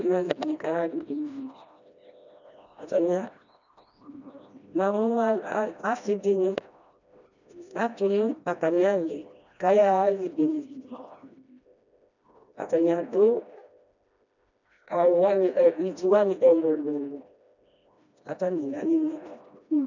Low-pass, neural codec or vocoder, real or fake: 7.2 kHz; codec, 16 kHz, 1 kbps, FreqCodec, smaller model; fake